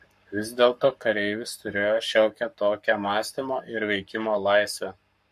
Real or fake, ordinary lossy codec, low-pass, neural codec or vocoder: fake; MP3, 64 kbps; 14.4 kHz; codec, 44.1 kHz, 7.8 kbps, Pupu-Codec